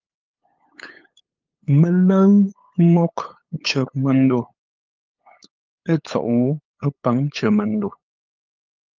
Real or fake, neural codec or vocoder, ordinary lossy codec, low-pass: fake; codec, 16 kHz, 8 kbps, FunCodec, trained on LibriTTS, 25 frames a second; Opus, 32 kbps; 7.2 kHz